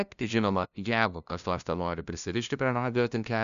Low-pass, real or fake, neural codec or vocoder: 7.2 kHz; fake; codec, 16 kHz, 0.5 kbps, FunCodec, trained on LibriTTS, 25 frames a second